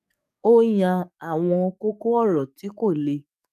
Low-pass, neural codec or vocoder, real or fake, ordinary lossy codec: 14.4 kHz; codec, 44.1 kHz, 7.8 kbps, DAC; fake; none